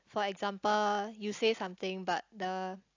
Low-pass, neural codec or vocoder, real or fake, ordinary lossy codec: 7.2 kHz; none; real; AAC, 48 kbps